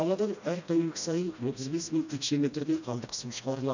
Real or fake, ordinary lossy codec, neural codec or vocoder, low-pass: fake; none; codec, 16 kHz, 1 kbps, FreqCodec, smaller model; 7.2 kHz